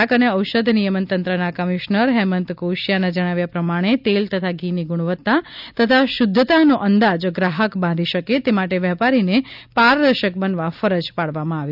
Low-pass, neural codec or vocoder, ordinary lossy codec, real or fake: 5.4 kHz; none; none; real